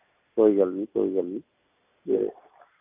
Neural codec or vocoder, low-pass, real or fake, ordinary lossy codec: none; 3.6 kHz; real; AAC, 32 kbps